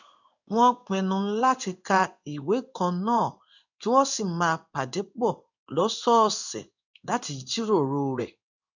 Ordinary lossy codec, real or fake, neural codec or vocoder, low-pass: none; fake; codec, 16 kHz in and 24 kHz out, 1 kbps, XY-Tokenizer; 7.2 kHz